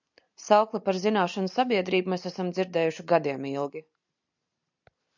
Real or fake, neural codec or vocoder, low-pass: real; none; 7.2 kHz